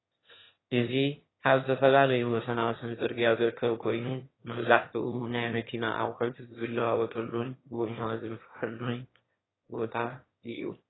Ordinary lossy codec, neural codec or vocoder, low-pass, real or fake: AAC, 16 kbps; autoencoder, 22.05 kHz, a latent of 192 numbers a frame, VITS, trained on one speaker; 7.2 kHz; fake